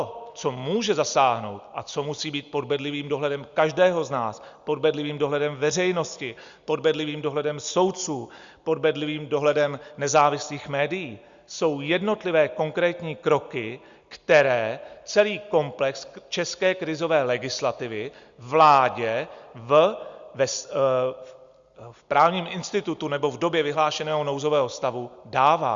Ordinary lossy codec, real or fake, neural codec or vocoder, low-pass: Opus, 64 kbps; real; none; 7.2 kHz